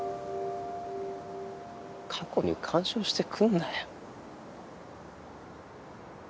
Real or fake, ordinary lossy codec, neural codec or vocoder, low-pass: real; none; none; none